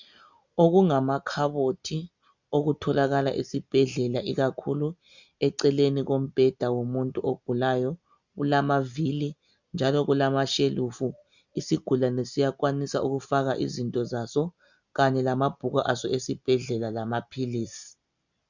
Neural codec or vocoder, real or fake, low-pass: none; real; 7.2 kHz